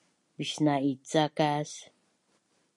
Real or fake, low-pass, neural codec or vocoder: real; 10.8 kHz; none